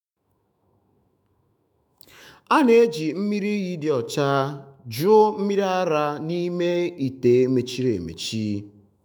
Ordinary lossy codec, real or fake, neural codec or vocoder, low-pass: none; fake; autoencoder, 48 kHz, 128 numbers a frame, DAC-VAE, trained on Japanese speech; none